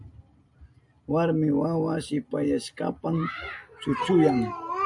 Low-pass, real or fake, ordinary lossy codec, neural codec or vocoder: 10.8 kHz; real; AAC, 64 kbps; none